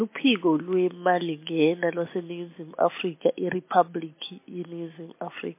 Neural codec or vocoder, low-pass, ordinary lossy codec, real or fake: none; 3.6 kHz; MP3, 24 kbps; real